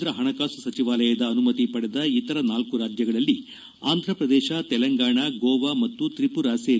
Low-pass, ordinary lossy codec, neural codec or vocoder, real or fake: none; none; none; real